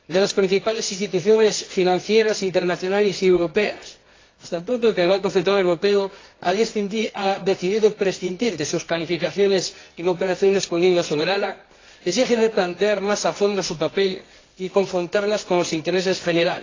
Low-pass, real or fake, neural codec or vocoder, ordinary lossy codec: 7.2 kHz; fake; codec, 24 kHz, 0.9 kbps, WavTokenizer, medium music audio release; AAC, 32 kbps